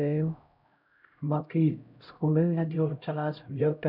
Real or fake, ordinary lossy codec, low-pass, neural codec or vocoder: fake; none; 5.4 kHz; codec, 16 kHz, 0.5 kbps, X-Codec, HuBERT features, trained on LibriSpeech